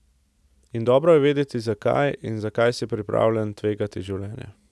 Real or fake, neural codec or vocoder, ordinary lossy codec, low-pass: real; none; none; none